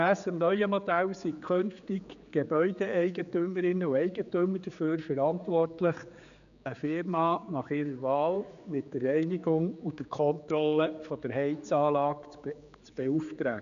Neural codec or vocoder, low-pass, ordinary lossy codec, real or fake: codec, 16 kHz, 4 kbps, X-Codec, HuBERT features, trained on general audio; 7.2 kHz; none; fake